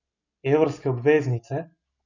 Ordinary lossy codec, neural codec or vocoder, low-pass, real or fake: none; none; 7.2 kHz; real